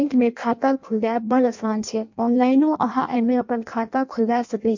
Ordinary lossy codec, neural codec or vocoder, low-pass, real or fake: MP3, 48 kbps; codec, 16 kHz in and 24 kHz out, 0.6 kbps, FireRedTTS-2 codec; 7.2 kHz; fake